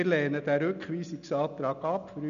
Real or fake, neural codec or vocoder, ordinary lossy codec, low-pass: real; none; none; 7.2 kHz